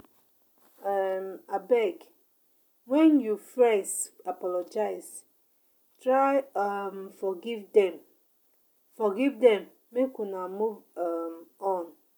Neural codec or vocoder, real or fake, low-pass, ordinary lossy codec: none; real; none; none